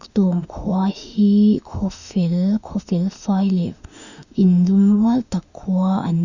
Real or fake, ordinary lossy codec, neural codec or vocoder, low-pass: fake; Opus, 64 kbps; autoencoder, 48 kHz, 32 numbers a frame, DAC-VAE, trained on Japanese speech; 7.2 kHz